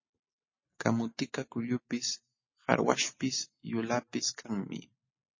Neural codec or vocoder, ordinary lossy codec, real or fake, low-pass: none; MP3, 32 kbps; real; 7.2 kHz